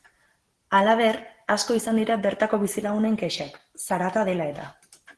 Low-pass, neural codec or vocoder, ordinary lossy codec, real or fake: 10.8 kHz; none; Opus, 16 kbps; real